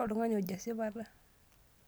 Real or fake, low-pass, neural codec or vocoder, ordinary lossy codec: real; none; none; none